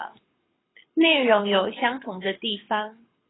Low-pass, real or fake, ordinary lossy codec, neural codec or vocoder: 7.2 kHz; fake; AAC, 16 kbps; codec, 24 kHz, 6 kbps, HILCodec